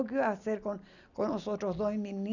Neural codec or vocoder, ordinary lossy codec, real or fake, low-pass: none; none; real; 7.2 kHz